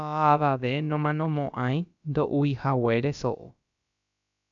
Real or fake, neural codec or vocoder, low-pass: fake; codec, 16 kHz, about 1 kbps, DyCAST, with the encoder's durations; 7.2 kHz